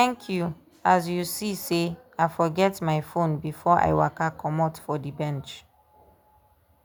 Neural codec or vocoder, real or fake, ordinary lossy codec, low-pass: none; real; none; none